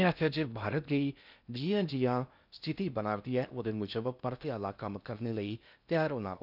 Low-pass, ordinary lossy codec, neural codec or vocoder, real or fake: 5.4 kHz; none; codec, 16 kHz in and 24 kHz out, 0.6 kbps, FocalCodec, streaming, 2048 codes; fake